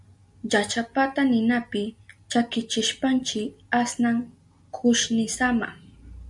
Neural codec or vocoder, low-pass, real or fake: none; 10.8 kHz; real